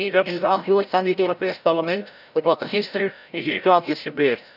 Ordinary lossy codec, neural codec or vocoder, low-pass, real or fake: none; codec, 16 kHz, 0.5 kbps, FreqCodec, larger model; 5.4 kHz; fake